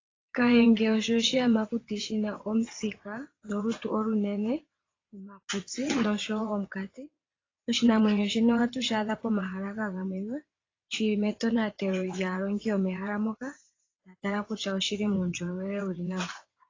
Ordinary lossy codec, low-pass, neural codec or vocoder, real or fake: AAC, 32 kbps; 7.2 kHz; vocoder, 22.05 kHz, 80 mel bands, WaveNeXt; fake